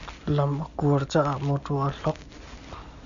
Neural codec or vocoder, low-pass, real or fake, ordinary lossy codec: none; 7.2 kHz; real; Opus, 64 kbps